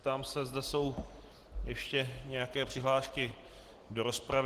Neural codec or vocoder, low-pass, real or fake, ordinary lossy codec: none; 14.4 kHz; real; Opus, 16 kbps